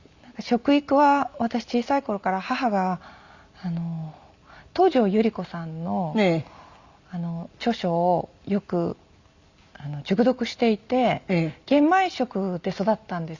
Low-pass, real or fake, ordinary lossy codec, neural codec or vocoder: 7.2 kHz; real; Opus, 64 kbps; none